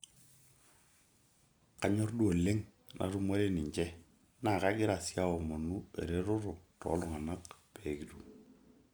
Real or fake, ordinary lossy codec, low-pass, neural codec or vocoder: real; none; none; none